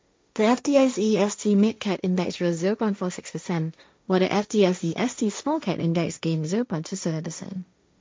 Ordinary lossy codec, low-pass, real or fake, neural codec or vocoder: none; none; fake; codec, 16 kHz, 1.1 kbps, Voila-Tokenizer